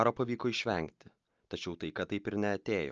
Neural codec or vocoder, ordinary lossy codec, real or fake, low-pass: none; Opus, 24 kbps; real; 7.2 kHz